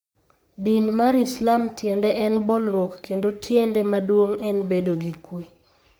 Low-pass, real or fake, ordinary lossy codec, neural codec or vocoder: none; fake; none; codec, 44.1 kHz, 3.4 kbps, Pupu-Codec